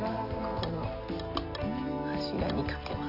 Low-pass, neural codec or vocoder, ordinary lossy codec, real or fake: 5.4 kHz; none; none; real